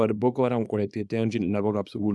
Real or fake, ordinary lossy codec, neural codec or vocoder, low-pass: fake; none; codec, 24 kHz, 0.9 kbps, WavTokenizer, small release; none